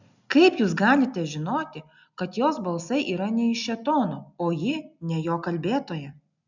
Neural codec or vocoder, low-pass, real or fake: none; 7.2 kHz; real